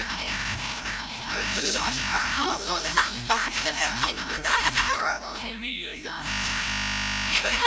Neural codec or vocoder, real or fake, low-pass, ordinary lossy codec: codec, 16 kHz, 0.5 kbps, FreqCodec, larger model; fake; none; none